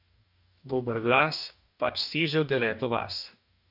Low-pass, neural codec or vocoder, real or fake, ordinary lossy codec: 5.4 kHz; codec, 44.1 kHz, 2.6 kbps, DAC; fake; none